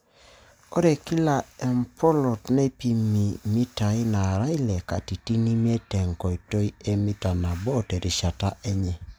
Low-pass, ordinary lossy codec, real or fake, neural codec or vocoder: none; none; real; none